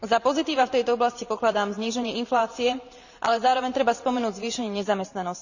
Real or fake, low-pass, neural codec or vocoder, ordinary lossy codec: fake; 7.2 kHz; vocoder, 44.1 kHz, 128 mel bands every 512 samples, BigVGAN v2; none